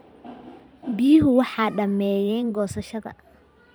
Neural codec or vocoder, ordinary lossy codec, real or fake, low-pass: vocoder, 44.1 kHz, 128 mel bands every 512 samples, BigVGAN v2; none; fake; none